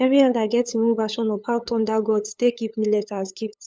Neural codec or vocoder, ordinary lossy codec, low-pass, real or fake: codec, 16 kHz, 8 kbps, FunCodec, trained on LibriTTS, 25 frames a second; none; none; fake